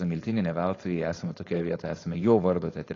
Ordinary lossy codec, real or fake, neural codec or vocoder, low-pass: AAC, 32 kbps; fake; codec, 16 kHz, 4.8 kbps, FACodec; 7.2 kHz